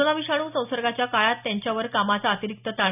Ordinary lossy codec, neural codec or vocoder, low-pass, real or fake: none; none; 3.6 kHz; real